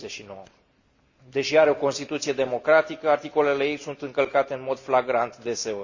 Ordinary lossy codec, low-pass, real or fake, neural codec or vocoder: Opus, 64 kbps; 7.2 kHz; real; none